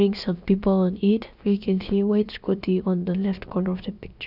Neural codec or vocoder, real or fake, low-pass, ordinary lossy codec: codec, 16 kHz, about 1 kbps, DyCAST, with the encoder's durations; fake; 5.4 kHz; none